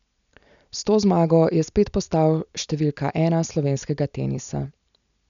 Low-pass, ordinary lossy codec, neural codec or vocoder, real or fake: 7.2 kHz; none; none; real